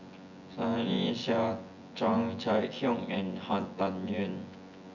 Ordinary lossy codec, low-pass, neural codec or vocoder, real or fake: Opus, 64 kbps; 7.2 kHz; vocoder, 24 kHz, 100 mel bands, Vocos; fake